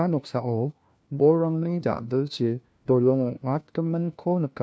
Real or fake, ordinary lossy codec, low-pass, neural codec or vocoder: fake; none; none; codec, 16 kHz, 1 kbps, FunCodec, trained on LibriTTS, 50 frames a second